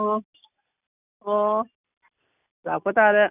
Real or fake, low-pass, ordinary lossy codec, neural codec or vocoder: real; 3.6 kHz; none; none